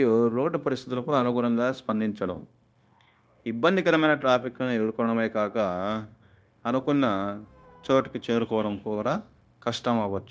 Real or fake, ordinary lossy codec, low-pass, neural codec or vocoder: fake; none; none; codec, 16 kHz, 0.9 kbps, LongCat-Audio-Codec